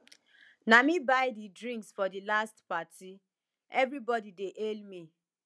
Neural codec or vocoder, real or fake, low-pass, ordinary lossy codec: none; real; none; none